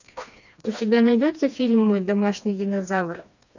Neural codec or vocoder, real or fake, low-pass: codec, 16 kHz, 2 kbps, FreqCodec, smaller model; fake; 7.2 kHz